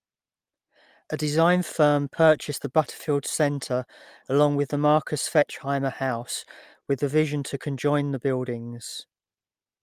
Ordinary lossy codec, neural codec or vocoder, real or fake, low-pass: Opus, 32 kbps; none; real; 14.4 kHz